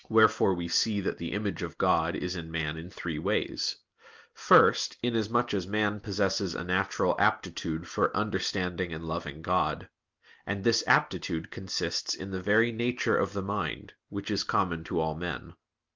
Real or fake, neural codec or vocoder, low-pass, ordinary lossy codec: real; none; 7.2 kHz; Opus, 24 kbps